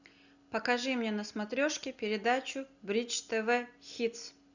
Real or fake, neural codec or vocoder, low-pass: real; none; 7.2 kHz